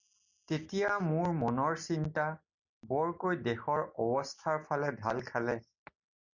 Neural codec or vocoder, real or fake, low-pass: none; real; 7.2 kHz